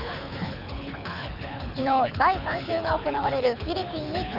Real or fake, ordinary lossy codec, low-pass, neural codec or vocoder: fake; none; 5.4 kHz; codec, 24 kHz, 6 kbps, HILCodec